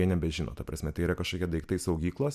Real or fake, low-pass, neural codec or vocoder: real; 14.4 kHz; none